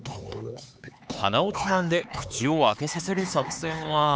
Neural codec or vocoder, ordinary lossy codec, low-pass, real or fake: codec, 16 kHz, 4 kbps, X-Codec, HuBERT features, trained on LibriSpeech; none; none; fake